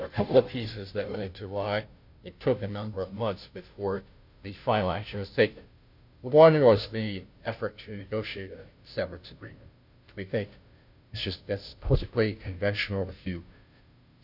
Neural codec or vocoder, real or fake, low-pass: codec, 16 kHz, 0.5 kbps, FunCodec, trained on Chinese and English, 25 frames a second; fake; 5.4 kHz